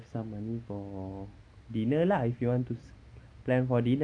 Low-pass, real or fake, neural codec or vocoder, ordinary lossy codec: 9.9 kHz; real; none; MP3, 96 kbps